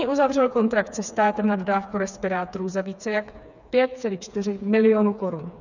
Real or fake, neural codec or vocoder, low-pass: fake; codec, 16 kHz, 4 kbps, FreqCodec, smaller model; 7.2 kHz